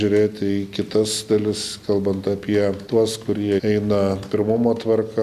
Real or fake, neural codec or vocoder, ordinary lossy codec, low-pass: real; none; Opus, 64 kbps; 14.4 kHz